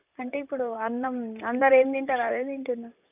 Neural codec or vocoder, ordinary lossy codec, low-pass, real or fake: vocoder, 44.1 kHz, 128 mel bands, Pupu-Vocoder; none; 3.6 kHz; fake